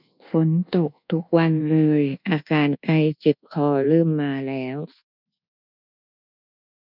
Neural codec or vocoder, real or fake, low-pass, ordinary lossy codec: codec, 24 kHz, 1.2 kbps, DualCodec; fake; 5.4 kHz; none